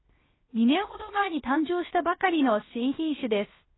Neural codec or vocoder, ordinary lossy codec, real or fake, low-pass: codec, 16 kHz, 0.7 kbps, FocalCodec; AAC, 16 kbps; fake; 7.2 kHz